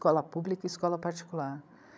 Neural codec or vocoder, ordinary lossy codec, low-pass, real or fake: codec, 16 kHz, 16 kbps, FreqCodec, larger model; none; none; fake